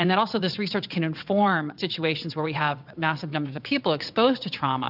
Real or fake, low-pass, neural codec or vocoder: fake; 5.4 kHz; vocoder, 22.05 kHz, 80 mel bands, WaveNeXt